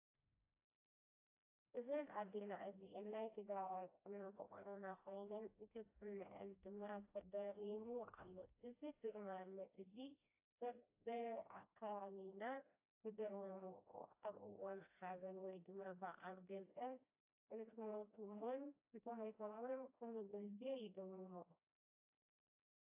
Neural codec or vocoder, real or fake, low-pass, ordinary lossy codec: codec, 16 kHz, 1 kbps, FreqCodec, smaller model; fake; 3.6 kHz; AAC, 32 kbps